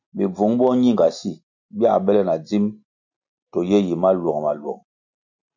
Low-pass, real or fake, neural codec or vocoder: 7.2 kHz; real; none